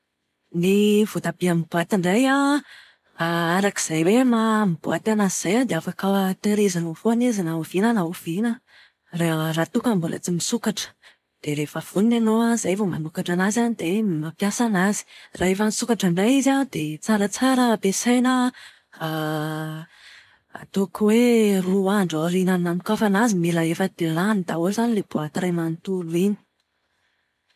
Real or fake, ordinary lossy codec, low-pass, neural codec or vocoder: real; none; 14.4 kHz; none